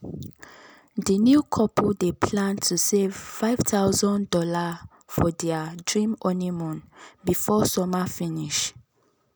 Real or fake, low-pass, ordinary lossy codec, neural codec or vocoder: real; none; none; none